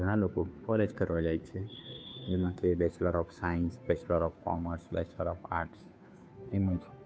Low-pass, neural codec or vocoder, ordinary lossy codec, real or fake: none; codec, 16 kHz, 2 kbps, FunCodec, trained on Chinese and English, 25 frames a second; none; fake